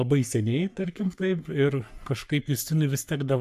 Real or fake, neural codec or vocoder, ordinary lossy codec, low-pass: fake; codec, 44.1 kHz, 3.4 kbps, Pupu-Codec; AAC, 96 kbps; 14.4 kHz